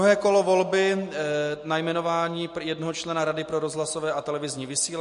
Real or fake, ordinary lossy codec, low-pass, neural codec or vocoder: real; MP3, 48 kbps; 14.4 kHz; none